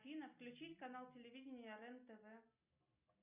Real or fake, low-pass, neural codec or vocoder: real; 3.6 kHz; none